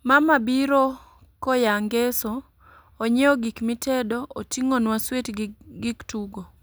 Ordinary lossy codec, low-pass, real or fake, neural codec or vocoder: none; none; real; none